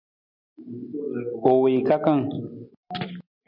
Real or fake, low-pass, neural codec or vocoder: real; 5.4 kHz; none